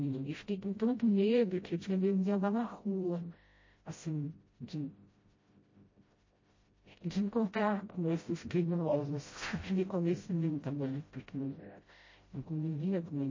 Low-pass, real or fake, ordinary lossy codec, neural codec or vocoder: 7.2 kHz; fake; MP3, 32 kbps; codec, 16 kHz, 0.5 kbps, FreqCodec, smaller model